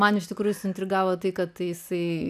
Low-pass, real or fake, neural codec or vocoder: 14.4 kHz; real; none